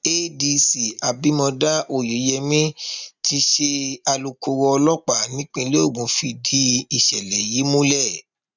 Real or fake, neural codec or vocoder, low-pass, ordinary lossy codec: real; none; 7.2 kHz; none